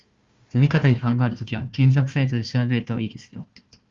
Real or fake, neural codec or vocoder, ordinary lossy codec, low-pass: fake; codec, 16 kHz, 1 kbps, FunCodec, trained on Chinese and English, 50 frames a second; Opus, 32 kbps; 7.2 kHz